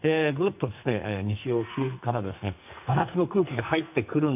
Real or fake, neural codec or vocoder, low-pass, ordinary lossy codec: fake; codec, 44.1 kHz, 2.6 kbps, SNAC; 3.6 kHz; AAC, 32 kbps